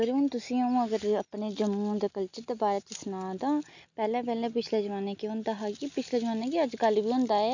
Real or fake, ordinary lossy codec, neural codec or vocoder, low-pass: real; none; none; 7.2 kHz